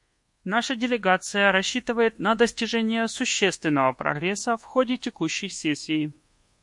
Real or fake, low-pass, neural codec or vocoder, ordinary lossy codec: fake; 10.8 kHz; codec, 24 kHz, 1.2 kbps, DualCodec; MP3, 48 kbps